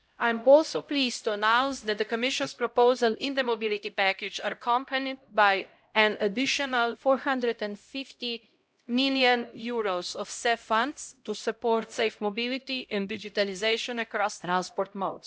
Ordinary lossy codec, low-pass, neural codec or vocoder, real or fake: none; none; codec, 16 kHz, 0.5 kbps, X-Codec, HuBERT features, trained on LibriSpeech; fake